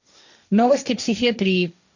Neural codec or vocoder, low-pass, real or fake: codec, 16 kHz, 1.1 kbps, Voila-Tokenizer; 7.2 kHz; fake